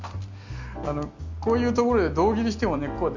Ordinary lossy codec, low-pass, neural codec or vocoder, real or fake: MP3, 64 kbps; 7.2 kHz; none; real